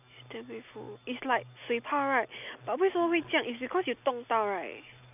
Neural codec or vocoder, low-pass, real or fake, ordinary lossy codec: none; 3.6 kHz; real; none